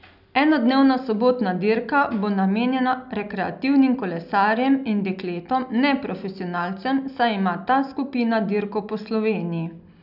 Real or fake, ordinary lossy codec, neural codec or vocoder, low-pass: real; none; none; 5.4 kHz